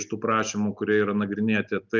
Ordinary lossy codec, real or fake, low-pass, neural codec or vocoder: Opus, 24 kbps; real; 7.2 kHz; none